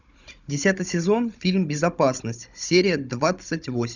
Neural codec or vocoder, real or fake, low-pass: codec, 16 kHz, 16 kbps, FunCodec, trained on Chinese and English, 50 frames a second; fake; 7.2 kHz